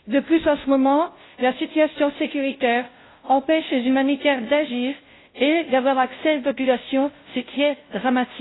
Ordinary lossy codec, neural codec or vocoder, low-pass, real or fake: AAC, 16 kbps; codec, 16 kHz, 0.5 kbps, FunCodec, trained on Chinese and English, 25 frames a second; 7.2 kHz; fake